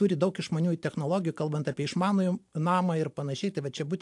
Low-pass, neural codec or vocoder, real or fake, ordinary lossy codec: 10.8 kHz; vocoder, 44.1 kHz, 128 mel bands every 512 samples, BigVGAN v2; fake; AAC, 64 kbps